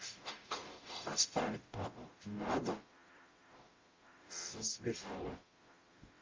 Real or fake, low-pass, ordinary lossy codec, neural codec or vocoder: fake; 7.2 kHz; Opus, 32 kbps; codec, 44.1 kHz, 0.9 kbps, DAC